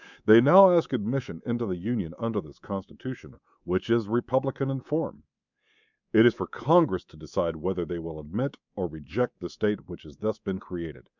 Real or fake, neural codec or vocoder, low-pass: fake; codec, 24 kHz, 3.1 kbps, DualCodec; 7.2 kHz